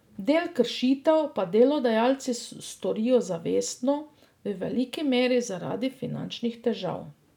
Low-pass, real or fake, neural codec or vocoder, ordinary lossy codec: 19.8 kHz; real; none; none